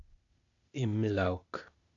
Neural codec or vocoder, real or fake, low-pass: codec, 16 kHz, 0.8 kbps, ZipCodec; fake; 7.2 kHz